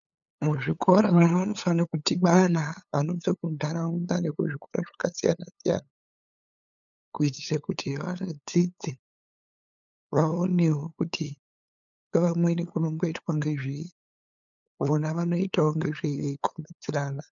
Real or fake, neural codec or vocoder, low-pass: fake; codec, 16 kHz, 8 kbps, FunCodec, trained on LibriTTS, 25 frames a second; 7.2 kHz